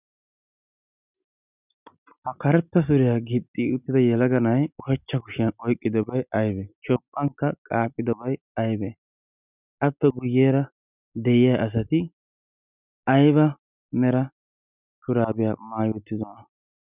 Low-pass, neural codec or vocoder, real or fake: 3.6 kHz; none; real